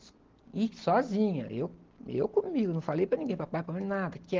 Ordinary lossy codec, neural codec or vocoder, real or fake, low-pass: Opus, 16 kbps; none; real; 7.2 kHz